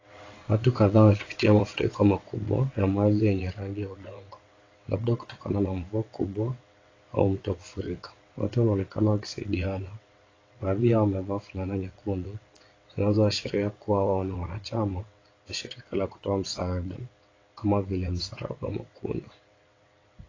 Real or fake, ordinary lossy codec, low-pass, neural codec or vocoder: fake; AAC, 32 kbps; 7.2 kHz; vocoder, 22.05 kHz, 80 mel bands, WaveNeXt